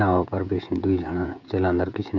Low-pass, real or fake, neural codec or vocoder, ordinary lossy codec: 7.2 kHz; fake; codec, 16 kHz, 16 kbps, FreqCodec, smaller model; none